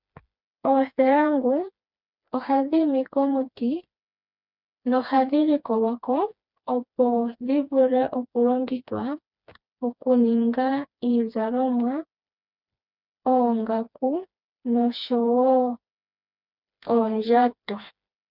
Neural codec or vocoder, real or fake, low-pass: codec, 16 kHz, 2 kbps, FreqCodec, smaller model; fake; 5.4 kHz